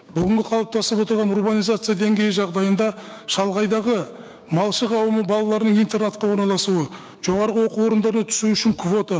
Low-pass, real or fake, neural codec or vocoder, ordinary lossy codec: none; fake; codec, 16 kHz, 6 kbps, DAC; none